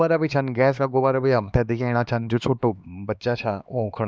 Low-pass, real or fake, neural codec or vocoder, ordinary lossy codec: none; fake; codec, 16 kHz, 2 kbps, X-Codec, HuBERT features, trained on LibriSpeech; none